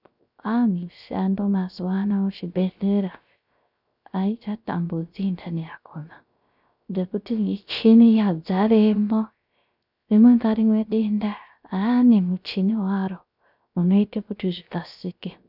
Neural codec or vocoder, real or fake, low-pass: codec, 16 kHz, 0.3 kbps, FocalCodec; fake; 5.4 kHz